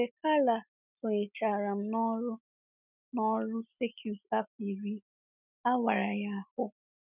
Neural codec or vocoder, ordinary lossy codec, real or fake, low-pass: none; none; real; 3.6 kHz